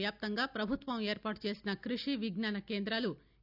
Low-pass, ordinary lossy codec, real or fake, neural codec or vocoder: 5.4 kHz; none; real; none